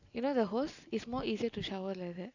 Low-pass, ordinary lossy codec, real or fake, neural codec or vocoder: 7.2 kHz; none; real; none